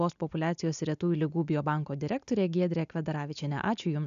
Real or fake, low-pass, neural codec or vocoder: real; 7.2 kHz; none